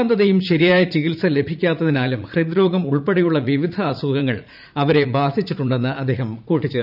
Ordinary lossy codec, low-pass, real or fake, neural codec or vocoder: none; 5.4 kHz; fake; vocoder, 44.1 kHz, 80 mel bands, Vocos